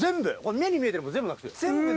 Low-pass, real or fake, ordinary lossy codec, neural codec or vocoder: none; real; none; none